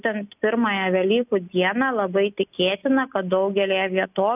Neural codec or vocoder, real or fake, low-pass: none; real; 3.6 kHz